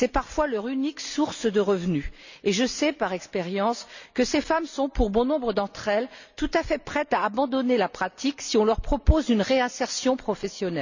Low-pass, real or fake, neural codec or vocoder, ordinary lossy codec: 7.2 kHz; real; none; none